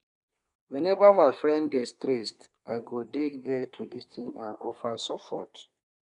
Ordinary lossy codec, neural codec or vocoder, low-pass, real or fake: none; codec, 24 kHz, 1 kbps, SNAC; 10.8 kHz; fake